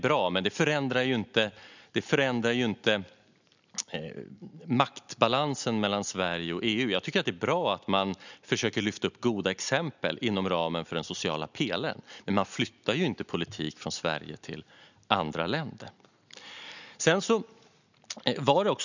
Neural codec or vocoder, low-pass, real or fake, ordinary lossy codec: none; 7.2 kHz; real; none